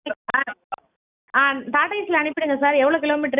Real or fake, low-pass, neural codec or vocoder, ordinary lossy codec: real; 3.6 kHz; none; none